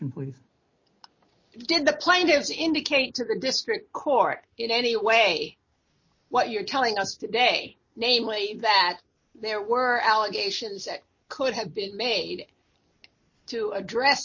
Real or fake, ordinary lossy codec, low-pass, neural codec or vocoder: real; MP3, 32 kbps; 7.2 kHz; none